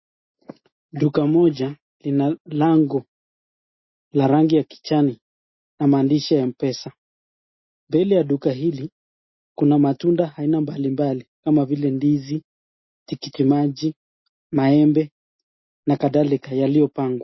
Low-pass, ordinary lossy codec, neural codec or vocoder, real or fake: 7.2 kHz; MP3, 24 kbps; none; real